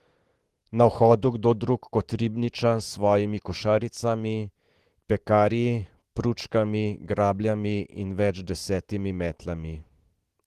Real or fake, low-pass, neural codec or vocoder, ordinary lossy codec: fake; 14.4 kHz; autoencoder, 48 kHz, 128 numbers a frame, DAC-VAE, trained on Japanese speech; Opus, 16 kbps